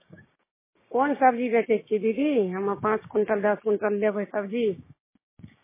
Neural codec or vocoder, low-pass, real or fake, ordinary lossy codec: codec, 16 kHz, 6 kbps, DAC; 3.6 kHz; fake; MP3, 16 kbps